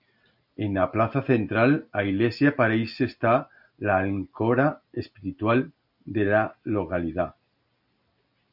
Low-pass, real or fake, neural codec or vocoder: 5.4 kHz; real; none